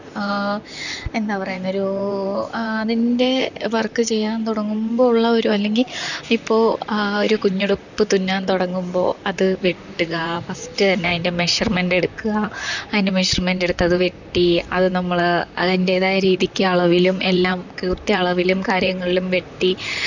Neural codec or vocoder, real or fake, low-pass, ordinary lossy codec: vocoder, 44.1 kHz, 128 mel bands, Pupu-Vocoder; fake; 7.2 kHz; none